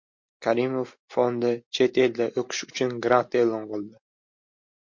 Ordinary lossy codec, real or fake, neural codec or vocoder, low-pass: MP3, 48 kbps; real; none; 7.2 kHz